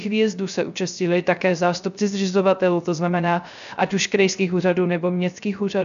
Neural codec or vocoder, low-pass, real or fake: codec, 16 kHz, 0.3 kbps, FocalCodec; 7.2 kHz; fake